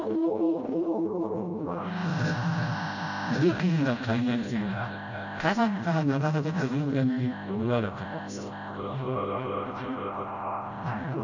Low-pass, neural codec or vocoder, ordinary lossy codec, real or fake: 7.2 kHz; codec, 16 kHz, 0.5 kbps, FreqCodec, smaller model; none; fake